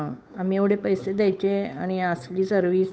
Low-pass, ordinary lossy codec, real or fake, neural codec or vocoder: none; none; fake; codec, 16 kHz, 8 kbps, FunCodec, trained on Chinese and English, 25 frames a second